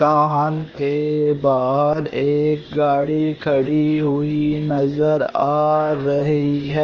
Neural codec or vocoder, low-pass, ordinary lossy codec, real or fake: codec, 16 kHz, 0.8 kbps, ZipCodec; 7.2 kHz; Opus, 24 kbps; fake